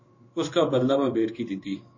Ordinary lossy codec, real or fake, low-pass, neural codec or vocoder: MP3, 32 kbps; fake; 7.2 kHz; codec, 16 kHz in and 24 kHz out, 1 kbps, XY-Tokenizer